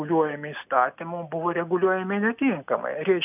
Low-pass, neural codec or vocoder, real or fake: 3.6 kHz; none; real